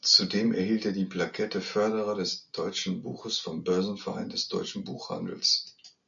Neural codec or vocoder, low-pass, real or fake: none; 7.2 kHz; real